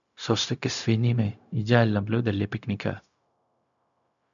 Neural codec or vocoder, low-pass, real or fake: codec, 16 kHz, 0.4 kbps, LongCat-Audio-Codec; 7.2 kHz; fake